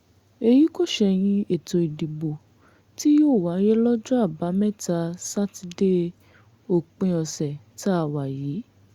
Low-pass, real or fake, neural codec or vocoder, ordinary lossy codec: 19.8 kHz; real; none; Opus, 64 kbps